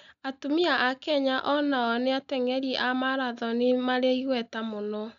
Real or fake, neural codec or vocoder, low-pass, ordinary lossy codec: real; none; 7.2 kHz; none